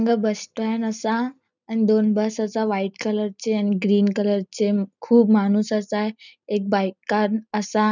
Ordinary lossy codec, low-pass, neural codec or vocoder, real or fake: none; 7.2 kHz; none; real